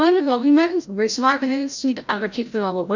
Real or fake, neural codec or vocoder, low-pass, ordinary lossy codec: fake; codec, 16 kHz, 0.5 kbps, FreqCodec, larger model; 7.2 kHz; none